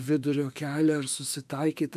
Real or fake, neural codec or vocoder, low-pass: fake; autoencoder, 48 kHz, 32 numbers a frame, DAC-VAE, trained on Japanese speech; 14.4 kHz